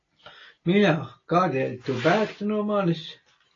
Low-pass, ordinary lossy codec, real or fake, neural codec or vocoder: 7.2 kHz; AAC, 32 kbps; real; none